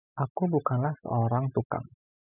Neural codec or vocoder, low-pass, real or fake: none; 3.6 kHz; real